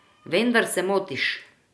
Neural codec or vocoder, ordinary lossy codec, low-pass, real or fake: none; none; none; real